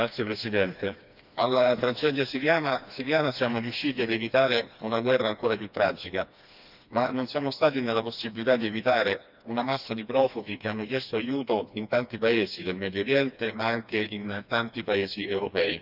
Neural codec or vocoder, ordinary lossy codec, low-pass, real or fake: codec, 16 kHz, 2 kbps, FreqCodec, smaller model; none; 5.4 kHz; fake